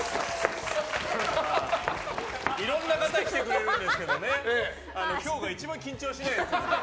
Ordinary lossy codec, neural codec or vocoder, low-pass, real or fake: none; none; none; real